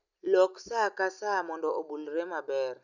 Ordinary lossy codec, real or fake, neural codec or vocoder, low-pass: none; real; none; 7.2 kHz